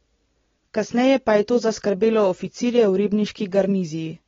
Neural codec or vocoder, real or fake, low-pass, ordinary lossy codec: none; real; 7.2 kHz; AAC, 24 kbps